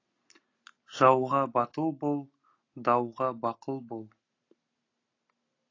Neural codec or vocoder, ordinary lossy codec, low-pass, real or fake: none; AAC, 32 kbps; 7.2 kHz; real